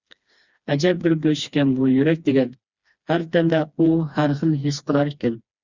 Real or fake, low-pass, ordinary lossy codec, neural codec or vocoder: fake; 7.2 kHz; Opus, 64 kbps; codec, 16 kHz, 2 kbps, FreqCodec, smaller model